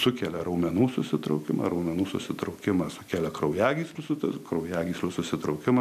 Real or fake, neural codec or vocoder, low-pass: real; none; 14.4 kHz